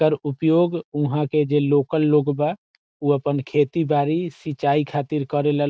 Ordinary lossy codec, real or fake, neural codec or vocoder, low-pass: none; real; none; none